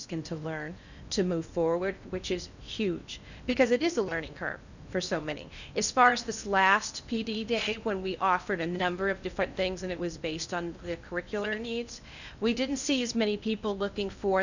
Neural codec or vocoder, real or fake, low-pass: codec, 16 kHz in and 24 kHz out, 0.8 kbps, FocalCodec, streaming, 65536 codes; fake; 7.2 kHz